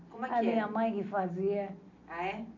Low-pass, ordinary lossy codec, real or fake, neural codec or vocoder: 7.2 kHz; none; real; none